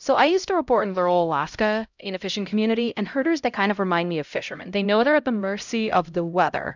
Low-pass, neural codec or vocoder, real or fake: 7.2 kHz; codec, 16 kHz, 0.5 kbps, X-Codec, HuBERT features, trained on LibriSpeech; fake